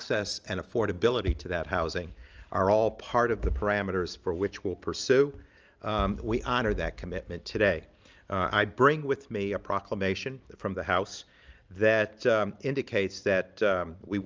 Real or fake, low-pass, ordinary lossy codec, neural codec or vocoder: real; 7.2 kHz; Opus, 24 kbps; none